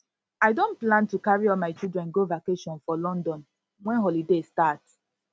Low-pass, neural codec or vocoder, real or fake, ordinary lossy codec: none; none; real; none